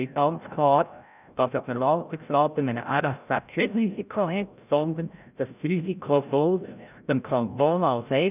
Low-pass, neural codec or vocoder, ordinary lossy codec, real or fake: 3.6 kHz; codec, 16 kHz, 0.5 kbps, FreqCodec, larger model; none; fake